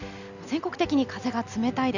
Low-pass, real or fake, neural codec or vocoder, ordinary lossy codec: 7.2 kHz; real; none; none